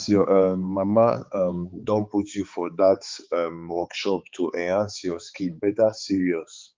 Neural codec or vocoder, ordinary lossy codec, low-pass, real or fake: codec, 16 kHz, 4 kbps, X-Codec, HuBERT features, trained on balanced general audio; Opus, 24 kbps; 7.2 kHz; fake